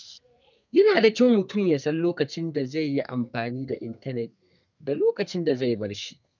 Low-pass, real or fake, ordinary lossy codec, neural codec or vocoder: 7.2 kHz; fake; none; codec, 32 kHz, 1.9 kbps, SNAC